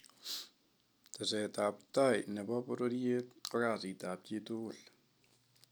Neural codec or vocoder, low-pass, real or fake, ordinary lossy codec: none; none; real; none